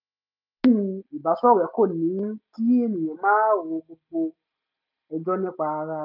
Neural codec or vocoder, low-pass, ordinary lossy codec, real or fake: none; 5.4 kHz; none; real